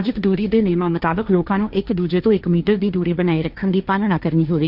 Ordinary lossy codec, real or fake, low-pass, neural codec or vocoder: none; fake; 5.4 kHz; codec, 16 kHz, 1.1 kbps, Voila-Tokenizer